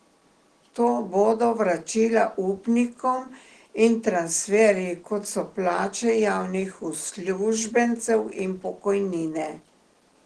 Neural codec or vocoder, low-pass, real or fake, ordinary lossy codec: none; 10.8 kHz; real; Opus, 16 kbps